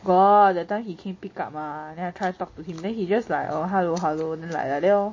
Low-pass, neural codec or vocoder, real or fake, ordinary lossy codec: 7.2 kHz; none; real; MP3, 32 kbps